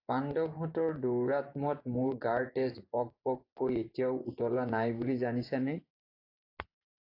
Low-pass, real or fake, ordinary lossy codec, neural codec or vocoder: 5.4 kHz; fake; AAC, 32 kbps; vocoder, 24 kHz, 100 mel bands, Vocos